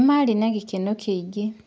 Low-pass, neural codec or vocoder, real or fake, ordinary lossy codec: none; none; real; none